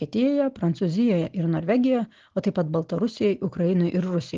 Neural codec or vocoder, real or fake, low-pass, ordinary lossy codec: none; real; 7.2 kHz; Opus, 16 kbps